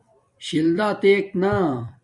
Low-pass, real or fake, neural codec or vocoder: 10.8 kHz; fake; vocoder, 44.1 kHz, 128 mel bands every 256 samples, BigVGAN v2